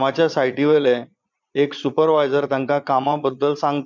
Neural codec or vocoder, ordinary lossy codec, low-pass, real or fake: vocoder, 22.05 kHz, 80 mel bands, Vocos; none; 7.2 kHz; fake